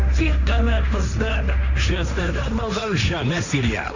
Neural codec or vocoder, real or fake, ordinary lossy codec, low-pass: codec, 16 kHz, 1.1 kbps, Voila-Tokenizer; fake; none; 7.2 kHz